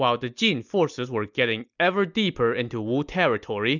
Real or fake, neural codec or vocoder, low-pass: real; none; 7.2 kHz